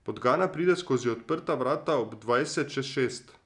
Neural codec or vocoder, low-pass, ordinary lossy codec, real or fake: none; 10.8 kHz; none; real